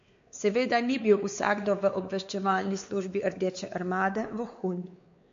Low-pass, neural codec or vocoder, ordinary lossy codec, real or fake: 7.2 kHz; codec, 16 kHz, 4 kbps, X-Codec, WavLM features, trained on Multilingual LibriSpeech; MP3, 48 kbps; fake